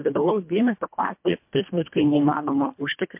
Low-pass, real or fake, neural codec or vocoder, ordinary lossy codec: 3.6 kHz; fake; codec, 24 kHz, 1.5 kbps, HILCodec; MP3, 32 kbps